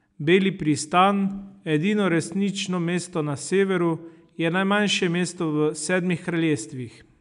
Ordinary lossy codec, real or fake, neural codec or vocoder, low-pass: none; real; none; 10.8 kHz